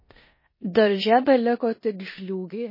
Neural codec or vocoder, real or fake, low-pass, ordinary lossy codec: codec, 16 kHz in and 24 kHz out, 0.9 kbps, LongCat-Audio-Codec, four codebook decoder; fake; 5.4 kHz; MP3, 24 kbps